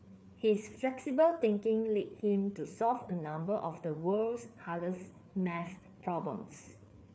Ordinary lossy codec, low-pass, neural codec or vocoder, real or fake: none; none; codec, 16 kHz, 4 kbps, FreqCodec, larger model; fake